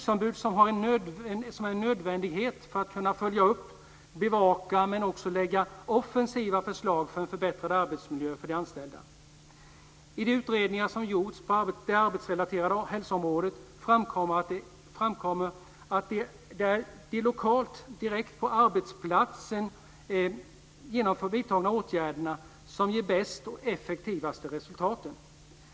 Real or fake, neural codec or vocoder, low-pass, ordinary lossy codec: real; none; none; none